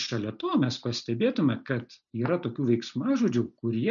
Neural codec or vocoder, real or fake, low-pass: none; real; 7.2 kHz